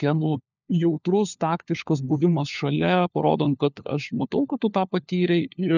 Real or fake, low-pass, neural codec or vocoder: fake; 7.2 kHz; codec, 16 kHz, 4 kbps, FunCodec, trained on LibriTTS, 50 frames a second